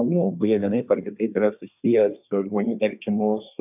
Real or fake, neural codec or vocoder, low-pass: fake; codec, 24 kHz, 1 kbps, SNAC; 3.6 kHz